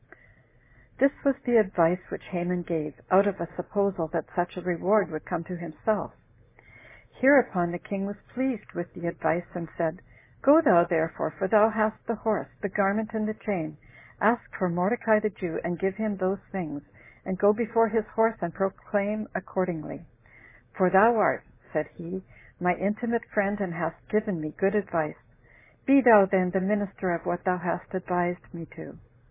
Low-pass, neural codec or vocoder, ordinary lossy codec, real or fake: 3.6 kHz; none; MP3, 16 kbps; real